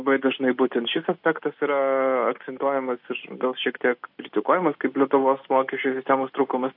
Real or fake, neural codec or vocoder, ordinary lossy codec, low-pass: real; none; AAC, 48 kbps; 5.4 kHz